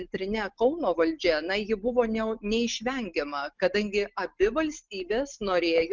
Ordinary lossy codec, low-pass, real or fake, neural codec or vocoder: Opus, 32 kbps; 7.2 kHz; real; none